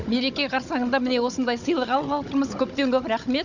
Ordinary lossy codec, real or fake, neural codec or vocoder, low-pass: none; fake; codec, 16 kHz, 16 kbps, FunCodec, trained on Chinese and English, 50 frames a second; 7.2 kHz